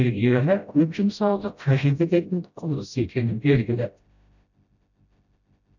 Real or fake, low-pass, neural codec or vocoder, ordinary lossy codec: fake; 7.2 kHz; codec, 16 kHz, 0.5 kbps, FreqCodec, smaller model; none